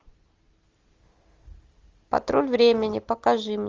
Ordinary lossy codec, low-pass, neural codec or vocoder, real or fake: Opus, 32 kbps; 7.2 kHz; none; real